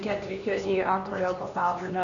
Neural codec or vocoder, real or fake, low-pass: codec, 16 kHz, 2 kbps, X-Codec, HuBERT features, trained on LibriSpeech; fake; 7.2 kHz